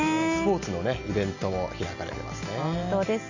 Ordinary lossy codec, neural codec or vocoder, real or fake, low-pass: Opus, 64 kbps; none; real; 7.2 kHz